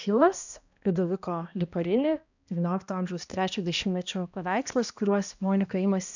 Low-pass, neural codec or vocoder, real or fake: 7.2 kHz; codec, 16 kHz, 1 kbps, X-Codec, HuBERT features, trained on balanced general audio; fake